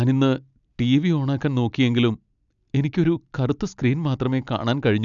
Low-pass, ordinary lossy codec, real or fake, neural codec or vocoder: 7.2 kHz; none; real; none